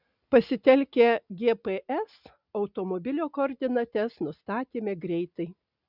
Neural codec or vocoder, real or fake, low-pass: none; real; 5.4 kHz